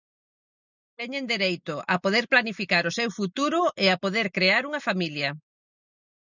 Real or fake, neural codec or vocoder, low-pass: real; none; 7.2 kHz